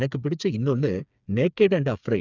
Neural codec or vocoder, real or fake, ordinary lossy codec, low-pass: codec, 16 kHz, 4 kbps, FreqCodec, smaller model; fake; none; 7.2 kHz